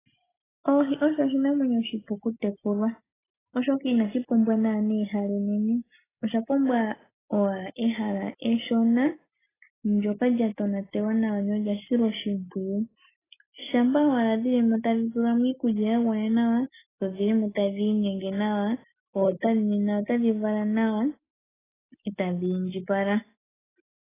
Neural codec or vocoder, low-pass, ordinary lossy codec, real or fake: none; 3.6 kHz; AAC, 16 kbps; real